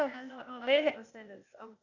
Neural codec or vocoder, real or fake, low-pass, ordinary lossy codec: codec, 16 kHz, 0.8 kbps, ZipCodec; fake; 7.2 kHz; none